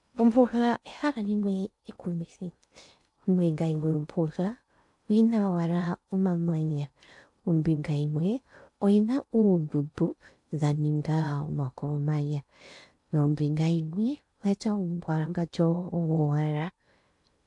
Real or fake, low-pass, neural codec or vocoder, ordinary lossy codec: fake; 10.8 kHz; codec, 16 kHz in and 24 kHz out, 0.6 kbps, FocalCodec, streaming, 2048 codes; AAC, 64 kbps